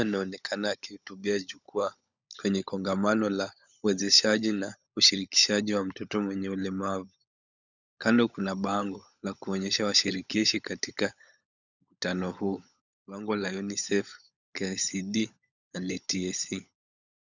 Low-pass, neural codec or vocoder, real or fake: 7.2 kHz; codec, 16 kHz, 16 kbps, FunCodec, trained on LibriTTS, 50 frames a second; fake